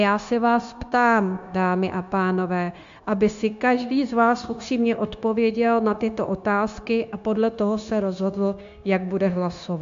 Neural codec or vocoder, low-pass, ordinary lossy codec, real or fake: codec, 16 kHz, 0.9 kbps, LongCat-Audio-Codec; 7.2 kHz; AAC, 64 kbps; fake